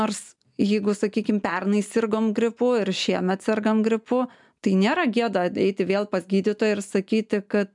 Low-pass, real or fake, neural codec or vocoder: 10.8 kHz; real; none